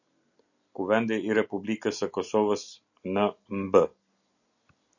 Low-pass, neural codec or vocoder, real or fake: 7.2 kHz; none; real